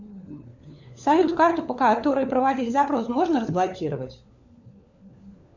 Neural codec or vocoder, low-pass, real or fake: codec, 16 kHz, 4 kbps, FunCodec, trained on LibriTTS, 50 frames a second; 7.2 kHz; fake